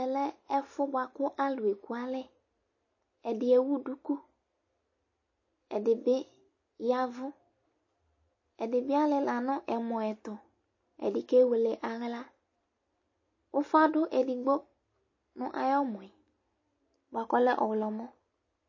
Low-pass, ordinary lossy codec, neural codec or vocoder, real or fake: 7.2 kHz; MP3, 32 kbps; none; real